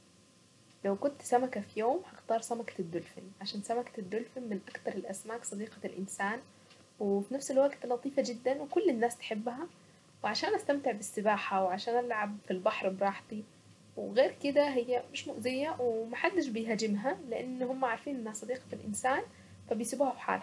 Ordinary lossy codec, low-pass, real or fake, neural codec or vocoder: none; none; real; none